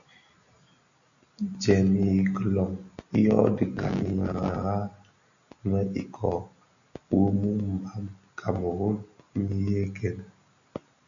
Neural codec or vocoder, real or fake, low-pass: none; real; 7.2 kHz